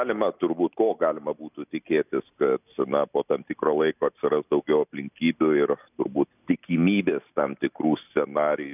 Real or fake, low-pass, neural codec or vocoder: real; 3.6 kHz; none